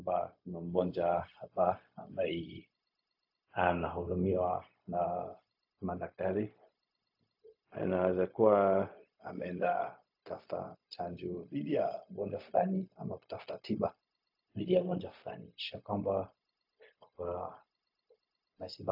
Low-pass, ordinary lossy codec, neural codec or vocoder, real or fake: 5.4 kHz; AAC, 48 kbps; codec, 16 kHz, 0.4 kbps, LongCat-Audio-Codec; fake